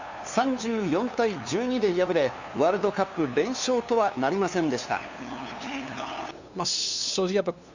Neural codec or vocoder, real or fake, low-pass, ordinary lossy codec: codec, 16 kHz, 2 kbps, FunCodec, trained on LibriTTS, 25 frames a second; fake; 7.2 kHz; Opus, 64 kbps